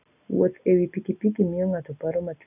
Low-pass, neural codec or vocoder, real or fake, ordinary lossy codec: 3.6 kHz; none; real; none